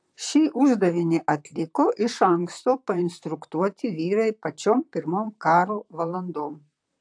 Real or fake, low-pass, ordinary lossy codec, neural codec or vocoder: fake; 9.9 kHz; AAC, 64 kbps; vocoder, 44.1 kHz, 128 mel bands, Pupu-Vocoder